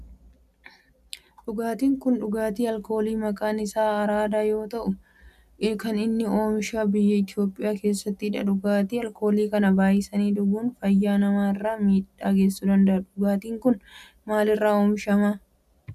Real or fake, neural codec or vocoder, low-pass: real; none; 14.4 kHz